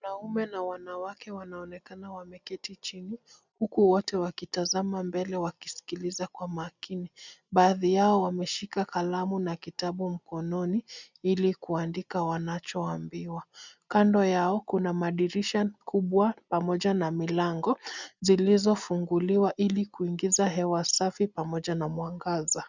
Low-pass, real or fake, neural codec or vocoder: 7.2 kHz; real; none